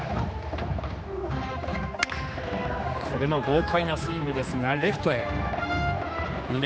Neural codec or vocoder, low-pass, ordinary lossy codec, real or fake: codec, 16 kHz, 2 kbps, X-Codec, HuBERT features, trained on general audio; none; none; fake